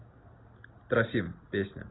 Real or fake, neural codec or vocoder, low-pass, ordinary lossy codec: real; none; 7.2 kHz; AAC, 16 kbps